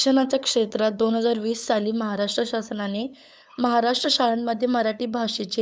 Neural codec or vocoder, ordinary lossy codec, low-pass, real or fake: codec, 16 kHz, 8 kbps, FunCodec, trained on LibriTTS, 25 frames a second; none; none; fake